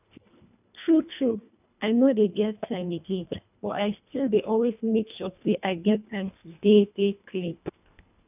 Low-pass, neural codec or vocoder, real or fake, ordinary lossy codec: 3.6 kHz; codec, 24 kHz, 1.5 kbps, HILCodec; fake; none